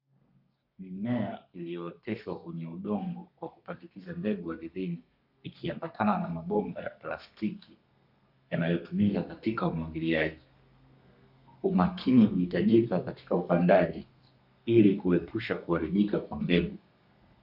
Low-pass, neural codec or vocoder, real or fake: 5.4 kHz; codec, 32 kHz, 1.9 kbps, SNAC; fake